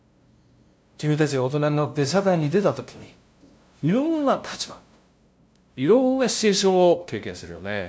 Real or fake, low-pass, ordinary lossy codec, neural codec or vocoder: fake; none; none; codec, 16 kHz, 0.5 kbps, FunCodec, trained on LibriTTS, 25 frames a second